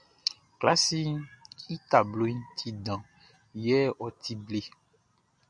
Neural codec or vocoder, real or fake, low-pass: none; real; 9.9 kHz